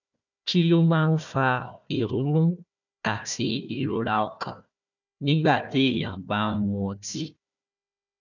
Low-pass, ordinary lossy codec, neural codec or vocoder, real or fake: 7.2 kHz; none; codec, 16 kHz, 1 kbps, FunCodec, trained on Chinese and English, 50 frames a second; fake